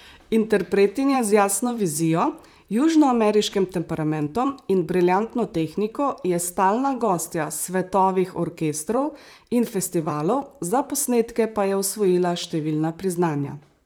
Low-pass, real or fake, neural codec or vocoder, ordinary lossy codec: none; fake; vocoder, 44.1 kHz, 128 mel bands, Pupu-Vocoder; none